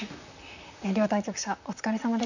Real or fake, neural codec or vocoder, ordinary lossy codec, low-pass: fake; vocoder, 44.1 kHz, 128 mel bands, Pupu-Vocoder; none; 7.2 kHz